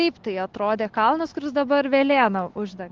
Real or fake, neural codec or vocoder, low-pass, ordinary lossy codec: real; none; 7.2 kHz; Opus, 16 kbps